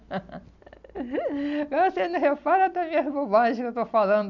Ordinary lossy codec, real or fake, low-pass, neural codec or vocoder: none; real; 7.2 kHz; none